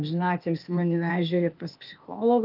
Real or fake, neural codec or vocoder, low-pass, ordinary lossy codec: fake; codec, 16 kHz, 0.8 kbps, ZipCodec; 5.4 kHz; Opus, 24 kbps